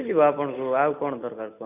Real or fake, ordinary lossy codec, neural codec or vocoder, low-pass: real; none; none; 3.6 kHz